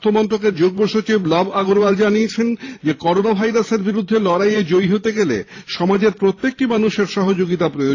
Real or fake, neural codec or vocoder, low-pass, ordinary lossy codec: fake; vocoder, 44.1 kHz, 128 mel bands every 512 samples, BigVGAN v2; 7.2 kHz; AAC, 32 kbps